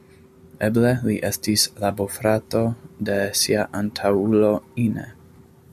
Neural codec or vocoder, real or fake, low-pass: none; real; 14.4 kHz